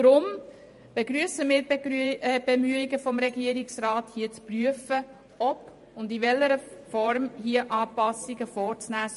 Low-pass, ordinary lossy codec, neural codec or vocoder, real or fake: 14.4 kHz; MP3, 48 kbps; vocoder, 44.1 kHz, 128 mel bands every 512 samples, BigVGAN v2; fake